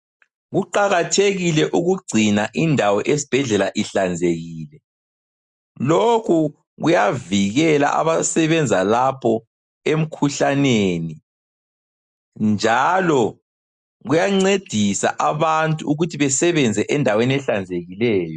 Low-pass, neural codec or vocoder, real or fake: 10.8 kHz; none; real